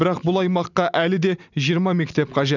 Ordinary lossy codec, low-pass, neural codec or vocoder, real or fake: none; 7.2 kHz; none; real